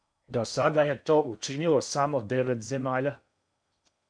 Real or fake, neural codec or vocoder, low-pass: fake; codec, 16 kHz in and 24 kHz out, 0.8 kbps, FocalCodec, streaming, 65536 codes; 9.9 kHz